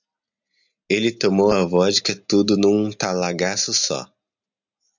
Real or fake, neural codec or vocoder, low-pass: real; none; 7.2 kHz